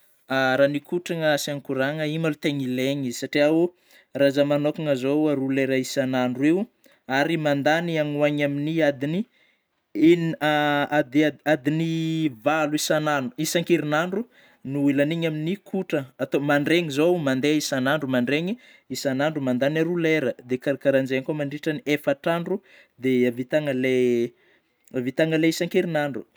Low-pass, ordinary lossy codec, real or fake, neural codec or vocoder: none; none; real; none